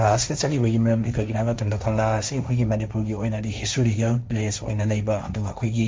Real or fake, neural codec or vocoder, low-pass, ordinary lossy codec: fake; codec, 16 kHz, 1.1 kbps, Voila-Tokenizer; none; none